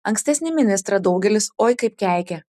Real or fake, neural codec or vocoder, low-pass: real; none; 14.4 kHz